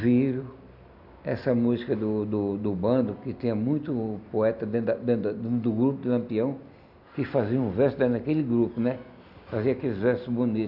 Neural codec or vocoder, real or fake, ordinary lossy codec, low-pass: none; real; none; 5.4 kHz